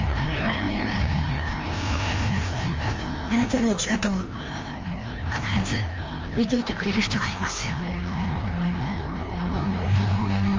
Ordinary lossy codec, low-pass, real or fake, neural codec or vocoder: Opus, 32 kbps; 7.2 kHz; fake; codec, 16 kHz, 1 kbps, FreqCodec, larger model